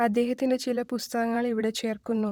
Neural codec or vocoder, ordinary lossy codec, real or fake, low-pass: vocoder, 44.1 kHz, 128 mel bands, Pupu-Vocoder; none; fake; 19.8 kHz